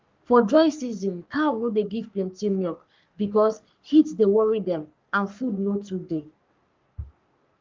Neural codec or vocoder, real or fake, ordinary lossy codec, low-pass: codec, 44.1 kHz, 3.4 kbps, Pupu-Codec; fake; Opus, 24 kbps; 7.2 kHz